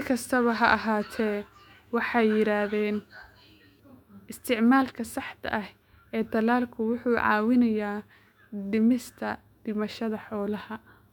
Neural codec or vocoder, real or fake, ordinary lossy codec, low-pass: autoencoder, 48 kHz, 128 numbers a frame, DAC-VAE, trained on Japanese speech; fake; none; 19.8 kHz